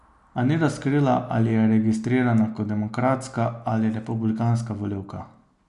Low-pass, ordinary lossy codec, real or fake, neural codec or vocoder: 10.8 kHz; none; real; none